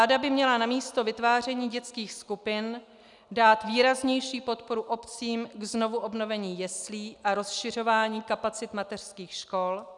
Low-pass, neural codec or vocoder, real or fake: 10.8 kHz; none; real